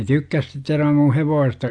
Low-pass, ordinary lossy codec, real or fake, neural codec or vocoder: 9.9 kHz; MP3, 96 kbps; real; none